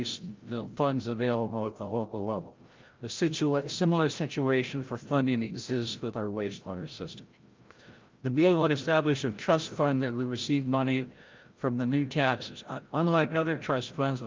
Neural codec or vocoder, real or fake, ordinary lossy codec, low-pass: codec, 16 kHz, 0.5 kbps, FreqCodec, larger model; fake; Opus, 32 kbps; 7.2 kHz